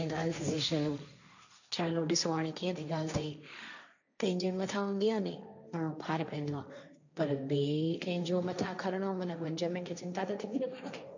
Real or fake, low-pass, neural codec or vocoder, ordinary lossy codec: fake; 7.2 kHz; codec, 16 kHz, 1.1 kbps, Voila-Tokenizer; none